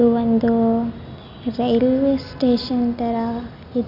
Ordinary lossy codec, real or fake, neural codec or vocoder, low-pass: none; real; none; 5.4 kHz